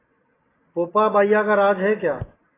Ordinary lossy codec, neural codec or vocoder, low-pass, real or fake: AAC, 16 kbps; none; 3.6 kHz; real